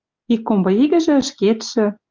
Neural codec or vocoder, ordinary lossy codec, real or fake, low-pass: none; Opus, 32 kbps; real; 7.2 kHz